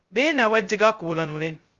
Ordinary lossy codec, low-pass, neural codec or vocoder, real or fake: Opus, 32 kbps; 7.2 kHz; codec, 16 kHz, 0.2 kbps, FocalCodec; fake